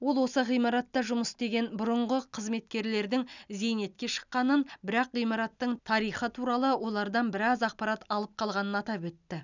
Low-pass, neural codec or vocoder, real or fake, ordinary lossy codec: 7.2 kHz; none; real; none